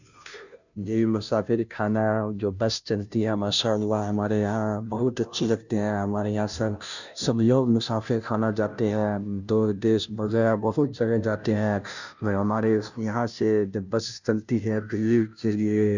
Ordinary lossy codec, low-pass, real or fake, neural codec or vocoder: none; 7.2 kHz; fake; codec, 16 kHz, 0.5 kbps, FunCodec, trained on Chinese and English, 25 frames a second